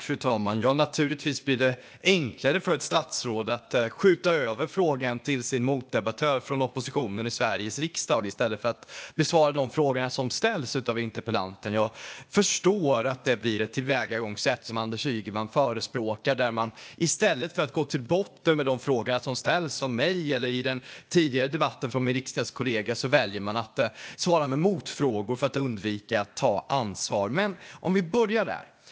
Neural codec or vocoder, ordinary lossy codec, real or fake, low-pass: codec, 16 kHz, 0.8 kbps, ZipCodec; none; fake; none